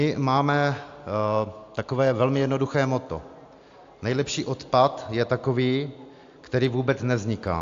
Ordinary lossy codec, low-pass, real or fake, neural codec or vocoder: AAC, 64 kbps; 7.2 kHz; real; none